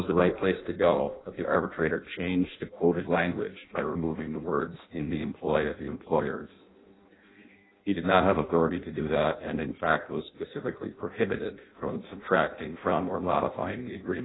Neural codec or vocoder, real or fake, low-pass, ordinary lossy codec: codec, 16 kHz in and 24 kHz out, 0.6 kbps, FireRedTTS-2 codec; fake; 7.2 kHz; AAC, 16 kbps